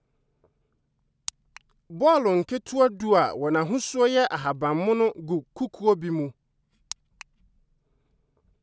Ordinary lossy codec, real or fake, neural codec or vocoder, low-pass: none; real; none; none